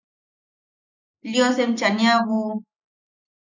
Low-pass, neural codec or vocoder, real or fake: 7.2 kHz; none; real